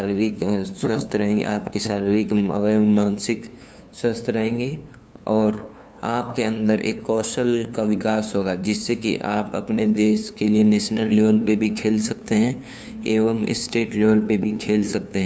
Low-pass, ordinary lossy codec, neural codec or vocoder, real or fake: none; none; codec, 16 kHz, 2 kbps, FunCodec, trained on LibriTTS, 25 frames a second; fake